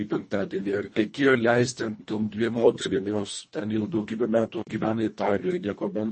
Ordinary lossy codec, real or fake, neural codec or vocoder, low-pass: MP3, 32 kbps; fake; codec, 24 kHz, 1.5 kbps, HILCodec; 10.8 kHz